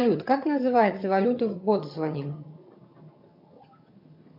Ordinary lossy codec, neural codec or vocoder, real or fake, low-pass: MP3, 48 kbps; vocoder, 22.05 kHz, 80 mel bands, HiFi-GAN; fake; 5.4 kHz